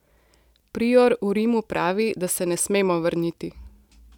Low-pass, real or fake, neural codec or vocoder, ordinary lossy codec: 19.8 kHz; real; none; none